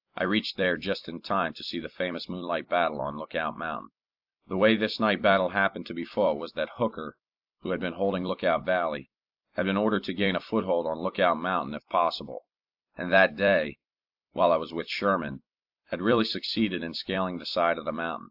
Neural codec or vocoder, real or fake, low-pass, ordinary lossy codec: vocoder, 44.1 kHz, 128 mel bands every 256 samples, BigVGAN v2; fake; 5.4 kHz; AAC, 48 kbps